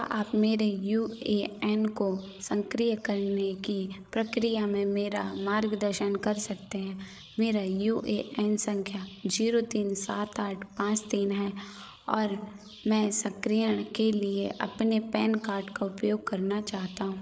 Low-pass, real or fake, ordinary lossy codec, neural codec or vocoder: none; fake; none; codec, 16 kHz, 8 kbps, FreqCodec, larger model